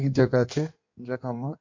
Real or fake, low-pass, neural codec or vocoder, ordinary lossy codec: fake; 7.2 kHz; codec, 16 kHz in and 24 kHz out, 1.1 kbps, FireRedTTS-2 codec; MP3, 48 kbps